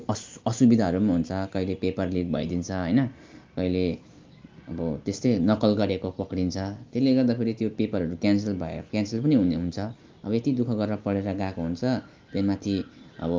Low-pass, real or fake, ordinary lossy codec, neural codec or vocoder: 7.2 kHz; real; Opus, 24 kbps; none